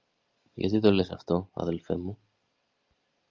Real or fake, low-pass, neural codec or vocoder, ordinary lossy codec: real; 7.2 kHz; none; Opus, 32 kbps